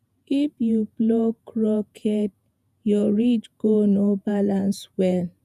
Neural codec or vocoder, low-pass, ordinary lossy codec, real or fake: vocoder, 48 kHz, 128 mel bands, Vocos; 14.4 kHz; none; fake